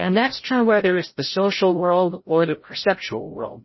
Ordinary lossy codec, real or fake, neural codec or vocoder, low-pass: MP3, 24 kbps; fake; codec, 16 kHz, 0.5 kbps, FreqCodec, larger model; 7.2 kHz